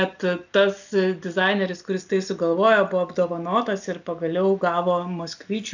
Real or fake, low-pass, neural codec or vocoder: real; 7.2 kHz; none